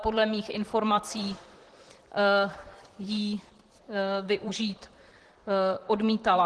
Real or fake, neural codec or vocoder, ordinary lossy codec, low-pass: fake; vocoder, 44.1 kHz, 128 mel bands, Pupu-Vocoder; Opus, 16 kbps; 10.8 kHz